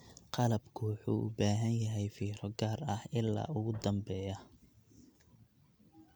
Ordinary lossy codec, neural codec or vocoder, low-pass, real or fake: none; none; none; real